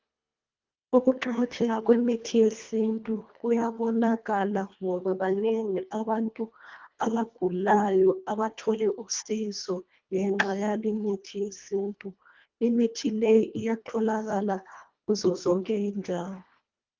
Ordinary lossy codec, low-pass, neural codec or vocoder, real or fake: Opus, 24 kbps; 7.2 kHz; codec, 24 kHz, 1.5 kbps, HILCodec; fake